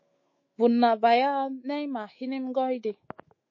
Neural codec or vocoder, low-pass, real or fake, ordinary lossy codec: autoencoder, 48 kHz, 128 numbers a frame, DAC-VAE, trained on Japanese speech; 7.2 kHz; fake; MP3, 32 kbps